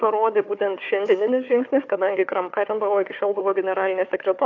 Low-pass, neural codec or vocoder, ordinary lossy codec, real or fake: 7.2 kHz; codec, 16 kHz, 4 kbps, FunCodec, trained on Chinese and English, 50 frames a second; AAC, 48 kbps; fake